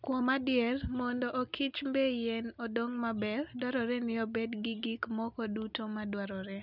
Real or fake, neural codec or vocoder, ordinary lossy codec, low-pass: real; none; none; 5.4 kHz